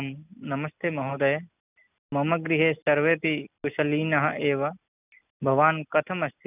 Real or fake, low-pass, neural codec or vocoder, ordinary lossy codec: real; 3.6 kHz; none; none